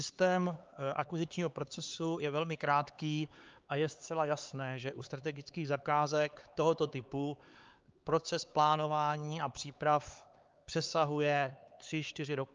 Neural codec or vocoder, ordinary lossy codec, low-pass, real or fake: codec, 16 kHz, 4 kbps, X-Codec, HuBERT features, trained on LibriSpeech; Opus, 24 kbps; 7.2 kHz; fake